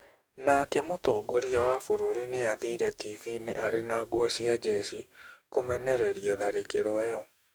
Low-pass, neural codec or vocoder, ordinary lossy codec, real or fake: none; codec, 44.1 kHz, 2.6 kbps, DAC; none; fake